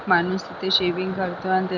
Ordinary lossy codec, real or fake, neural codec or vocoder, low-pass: none; real; none; 7.2 kHz